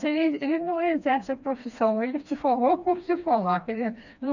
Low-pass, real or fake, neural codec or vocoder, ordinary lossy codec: 7.2 kHz; fake; codec, 16 kHz, 2 kbps, FreqCodec, smaller model; none